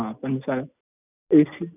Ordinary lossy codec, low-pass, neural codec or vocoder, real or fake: none; 3.6 kHz; none; real